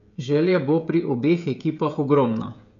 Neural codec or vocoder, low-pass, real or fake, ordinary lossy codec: codec, 16 kHz, 16 kbps, FreqCodec, smaller model; 7.2 kHz; fake; none